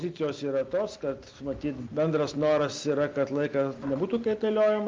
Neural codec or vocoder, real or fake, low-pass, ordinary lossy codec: none; real; 7.2 kHz; Opus, 32 kbps